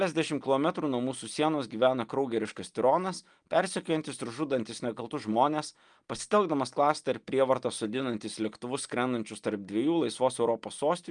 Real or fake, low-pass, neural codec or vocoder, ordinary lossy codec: real; 9.9 kHz; none; Opus, 32 kbps